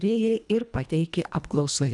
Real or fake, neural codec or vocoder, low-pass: fake; codec, 24 kHz, 1.5 kbps, HILCodec; 10.8 kHz